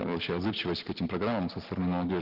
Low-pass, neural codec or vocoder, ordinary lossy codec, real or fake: 5.4 kHz; none; Opus, 16 kbps; real